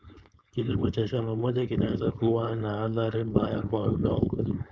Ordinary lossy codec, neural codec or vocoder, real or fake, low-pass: none; codec, 16 kHz, 4.8 kbps, FACodec; fake; none